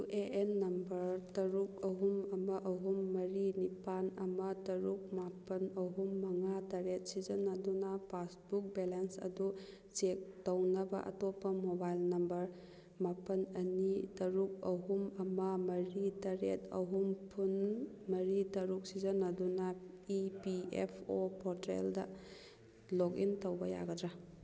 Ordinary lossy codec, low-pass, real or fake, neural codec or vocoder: none; none; real; none